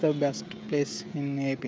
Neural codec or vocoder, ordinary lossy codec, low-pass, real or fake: codec, 16 kHz, 16 kbps, FreqCodec, smaller model; none; none; fake